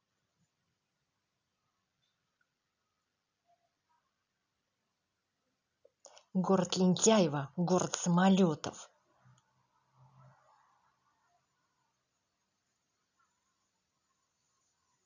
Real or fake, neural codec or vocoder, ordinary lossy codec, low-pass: real; none; none; 7.2 kHz